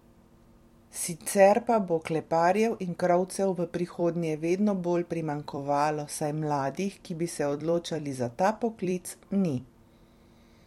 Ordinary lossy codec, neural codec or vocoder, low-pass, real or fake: MP3, 64 kbps; vocoder, 44.1 kHz, 128 mel bands every 256 samples, BigVGAN v2; 19.8 kHz; fake